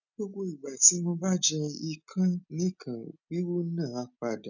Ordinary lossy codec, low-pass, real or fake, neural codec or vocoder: none; 7.2 kHz; real; none